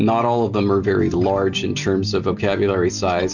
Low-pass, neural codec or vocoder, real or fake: 7.2 kHz; none; real